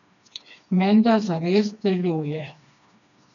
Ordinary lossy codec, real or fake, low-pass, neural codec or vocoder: none; fake; 7.2 kHz; codec, 16 kHz, 2 kbps, FreqCodec, smaller model